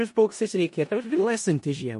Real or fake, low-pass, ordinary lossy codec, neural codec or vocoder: fake; 10.8 kHz; MP3, 48 kbps; codec, 16 kHz in and 24 kHz out, 0.4 kbps, LongCat-Audio-Codec, four codebook decoder